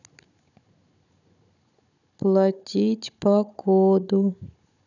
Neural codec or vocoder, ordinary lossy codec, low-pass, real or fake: codec, 16 kHz, 16 kbps, FunCodec, trained on Chinese and English, 50 frames a second; none; 7.2 kHz; fake